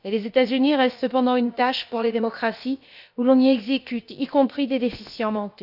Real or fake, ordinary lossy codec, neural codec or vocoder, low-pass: fake; none; codec, 16 kHz, about 1 kbps, DyCAST, with the encoder's durations; 5.4 kHz